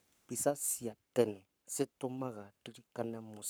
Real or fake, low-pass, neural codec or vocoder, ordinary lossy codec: fake; none; codec, 44.1 kHz, 7.8 kbps, Pupu-Codec; none